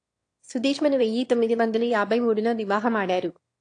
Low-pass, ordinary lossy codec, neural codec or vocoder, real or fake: 9.9 kHz; AAC, 48 kbps; autoencoder, 22.05 kHz, a latent of 192 numbers a frame, VITS, trained on one speaker; fake